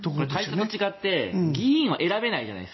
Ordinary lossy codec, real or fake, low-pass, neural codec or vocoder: MP3, 24 kbps; real; 7.2 kHz; none